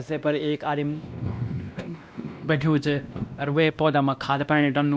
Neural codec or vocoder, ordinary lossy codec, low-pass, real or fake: codec, 16 kHz, 1 kbps, X-Codec, WavLM features, trained on Multilingual LibriSpeech; none; none; fake